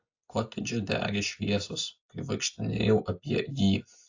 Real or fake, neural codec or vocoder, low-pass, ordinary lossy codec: real; none; 7.2 kHz; AAC, 48 kbps